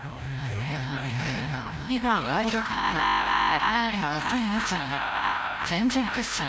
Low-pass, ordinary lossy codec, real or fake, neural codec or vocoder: none; none; fake; codec, 16 kHz, 0.5 kbps, FreqCodec, larger model